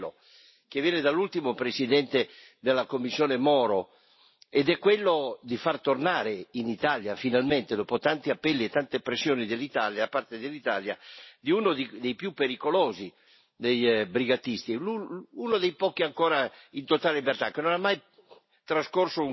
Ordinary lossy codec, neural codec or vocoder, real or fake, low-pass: MP3, 24 kbps; none; real; 7.2 kHz